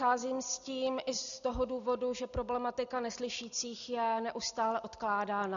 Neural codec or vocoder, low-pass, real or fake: none; 7.2 kHz; real